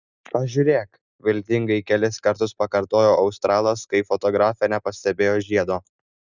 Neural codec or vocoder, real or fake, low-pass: none; real; 7.2 kHz